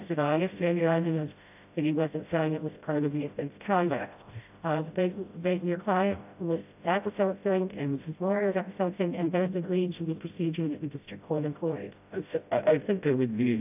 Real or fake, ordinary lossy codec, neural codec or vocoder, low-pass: fake; AAC, 32 kbps; codec, 16 kHz, 0.5 kbps, FreqCodec, smaller model; 3.6 kHz